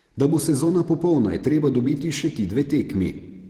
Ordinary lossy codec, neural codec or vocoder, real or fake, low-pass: Opus, 16 kbps; vocoder, 48 kHz, 128 mel bands, Vocos; fake; 19.8 kHz